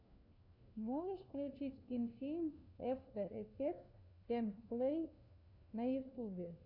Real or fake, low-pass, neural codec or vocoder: fake; 5.4 kHz; codec, 16 kHz, 1 kbps, FunCodec, trained on LibriTTS, 50 frames a second